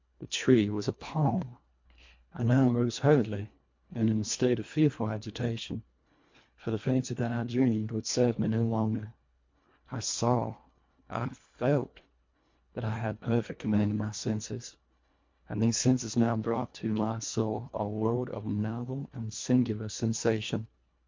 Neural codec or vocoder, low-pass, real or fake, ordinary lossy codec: codec, 24 kHz, 1.5 kbps, HILCodec; 7.2 kHz; fake; MP3, 48 kbps